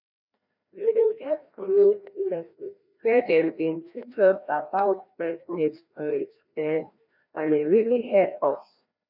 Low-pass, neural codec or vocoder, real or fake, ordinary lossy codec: 5.4 kHz; codec, 16 kHz, 1 kbps, FreqCodec, larger model; fake; none